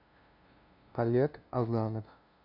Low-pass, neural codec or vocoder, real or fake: 5.4 kHz; codec, 16 kHz, 0.5 kbps, FunCodec, trained on LibriTTS, 25 frames a second; fake